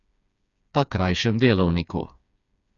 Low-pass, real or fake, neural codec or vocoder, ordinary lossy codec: 7.2 kHz; fake; codec, 16 kHz, 4 kbps, FreqCodec, smaller model; none